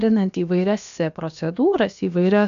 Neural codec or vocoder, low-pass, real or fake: codec, 16 kHz, about 1 kbps, DyCAST, with the encoder's durations; 7.2 kHz; fake